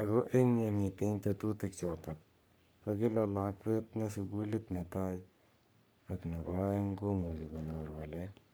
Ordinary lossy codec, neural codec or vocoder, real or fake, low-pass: none; codec, 44.1 kHz, 3.4 kbps, Pupu-Codec; fake; none